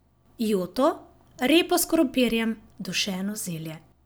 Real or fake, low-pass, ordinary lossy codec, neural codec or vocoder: real; none; none; none